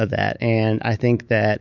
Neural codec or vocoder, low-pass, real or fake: autoencoder, 48 kHz, 128 numbers a frame, DAC-VAE, trained on Japanese speech; 7.2 kHz; fake